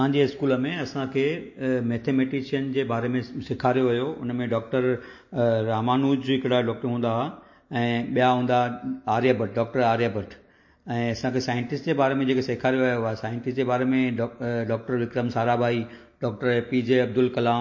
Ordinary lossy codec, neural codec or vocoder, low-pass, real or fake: MP3, 32 kbps; none; 7.2 kHz; real